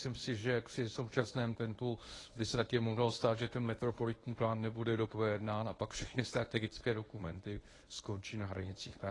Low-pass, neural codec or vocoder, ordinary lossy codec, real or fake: 10.8 kHz; codec, 24 kHz, 0.9 kbps, WavTokenizer, medium speech release version 1; AAC, 32 kbps; fake